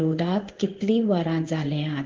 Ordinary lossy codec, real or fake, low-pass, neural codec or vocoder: Opus, 16 kbps; fake; 7.2 kHz; codec, 16 kHz in and 24 kHz out, 1 kbps, XY-Tokenizer